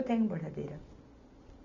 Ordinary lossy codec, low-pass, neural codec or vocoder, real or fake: none; 7.2 kHz; none; real